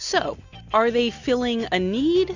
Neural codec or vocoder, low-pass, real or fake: none; 7.2 kHz; real